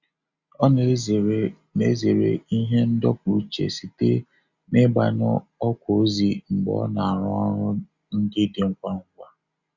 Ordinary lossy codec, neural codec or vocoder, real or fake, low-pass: Opus, 64 kbps; none; real; 7.2 kHz